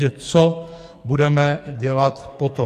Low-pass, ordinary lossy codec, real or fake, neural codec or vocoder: 14.4 kHz; MP3, 64 kbps; fake; codec, 44.1 kHz, 2.6 kbps, SNAC